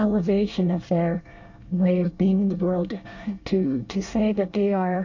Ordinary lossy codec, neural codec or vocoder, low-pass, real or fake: AAC, 48 kbps; codec, 24 kHz, 1 kbps, SNAC; 7.2 kHz; fake